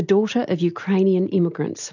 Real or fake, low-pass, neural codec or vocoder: real; 7.2 kHz; none